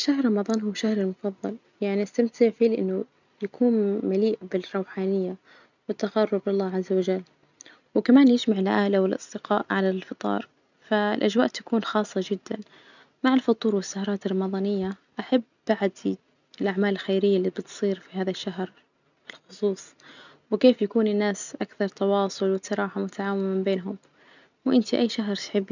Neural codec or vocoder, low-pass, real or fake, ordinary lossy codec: none; 7.2 kHz; real; none